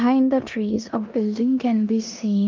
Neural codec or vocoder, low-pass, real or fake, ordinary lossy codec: codec, 16 kHz in and 24 kHz out, 0.9 kbps, LongCat-Audio-Codec, four codebook decoder; 7.2 kHz; fake; Opus, 24 kbps